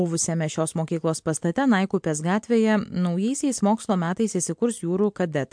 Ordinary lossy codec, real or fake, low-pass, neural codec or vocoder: MP3, 64 kbps; real; 9.9 kHz; none